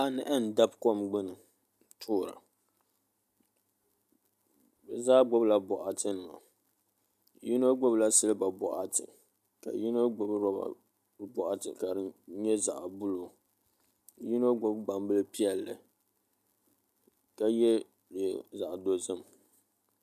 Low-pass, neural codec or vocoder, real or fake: 14.4 kHz; none; real